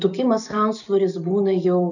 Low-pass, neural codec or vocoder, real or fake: 7.2 kHz; none; real